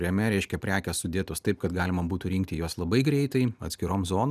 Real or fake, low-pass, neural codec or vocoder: real; 14.4 kHz; none